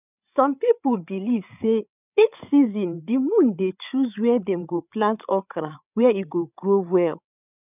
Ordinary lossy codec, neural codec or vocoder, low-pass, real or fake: none; codec, 16 kHz, 8 kbps, FreqCodec, larger model; 3.6 kHz; fake